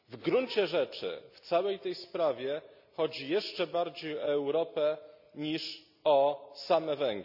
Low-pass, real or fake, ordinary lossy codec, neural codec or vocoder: 5.4 kHz; real; none; none